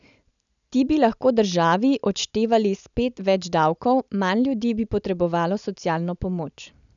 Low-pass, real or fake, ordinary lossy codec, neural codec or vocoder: 7.2 kHz; real; none; none